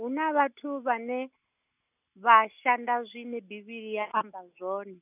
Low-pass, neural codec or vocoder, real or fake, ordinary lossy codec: 3.6 kHz; none; real; none